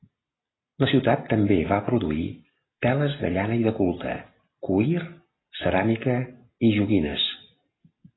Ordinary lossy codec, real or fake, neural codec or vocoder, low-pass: AAC, 16 kbps; real; none; 7.2 kHz